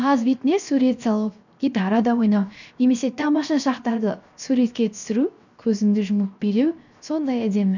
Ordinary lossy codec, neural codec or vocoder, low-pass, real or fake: none; codec, 16 kHz, 0.7 kbps, FocalCodec; 7.2 kHz; fake